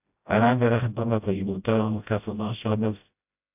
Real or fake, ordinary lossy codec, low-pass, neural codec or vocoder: fake; AAC, 32 kbps; 3.6 kHz; codec, 16 kHz, 0.5 kbps, FreqCodec, smaller model